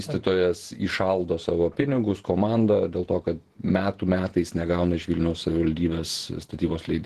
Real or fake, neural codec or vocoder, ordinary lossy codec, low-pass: fake; vocoder, 48 kHz, 128 mel bands, Vocos; Opus, 16 kbps; 14.4 kHz